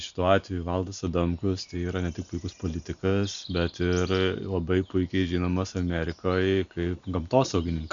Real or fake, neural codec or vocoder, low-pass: real; none; 7.2 kHz